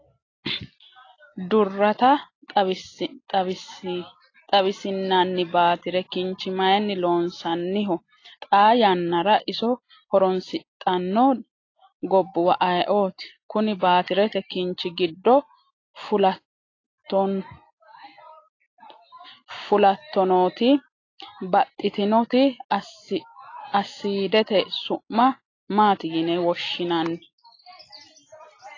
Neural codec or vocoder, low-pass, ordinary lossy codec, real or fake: none; 7.2 kHz; AAC, 32 kbps; real